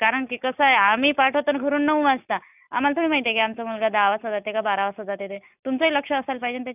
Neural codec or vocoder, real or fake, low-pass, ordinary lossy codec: none; real; 3.6 kHz; none